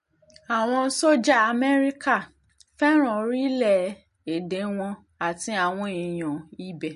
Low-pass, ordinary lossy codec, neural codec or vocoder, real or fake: 14.4 kHz; MP3, 48 kbps; none; real